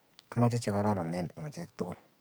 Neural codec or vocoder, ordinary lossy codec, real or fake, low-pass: codec, 44.1 kHz, 2.6 kbps, SNAC; none; fake; none